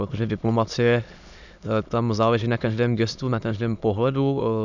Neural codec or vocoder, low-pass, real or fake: autoencoder, 22.05 kHz, a latent of 192 numbers a frame, VITS, trained on many speakers; 7.2 kHz; fake